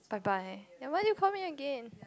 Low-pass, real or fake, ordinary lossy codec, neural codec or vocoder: none; real; none; none